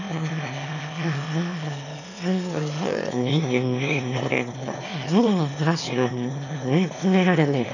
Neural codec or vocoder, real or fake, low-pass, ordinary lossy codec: autoencoder, 22.05 kHz, a latent of 192 numbers a frame, VITS, trained on one speaker; fake; 7.2 kHz; none